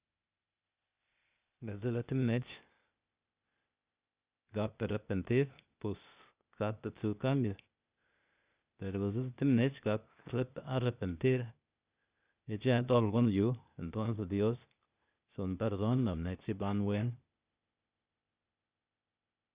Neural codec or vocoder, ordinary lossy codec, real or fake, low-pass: codec, 16 kHz, 0.8 kbps, ZipCodec; Opus, 64 kbps; fake; 3.6 kHz